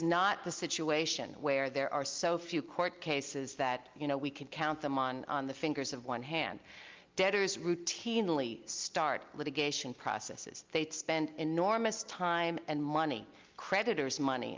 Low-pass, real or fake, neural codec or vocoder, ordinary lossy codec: 7.2 kHz; real; none; Opus, 24 kbps